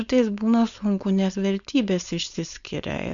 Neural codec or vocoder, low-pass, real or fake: codec, 16 kHz, 4.8 kbps, FACodec; 7.2 kHz; fake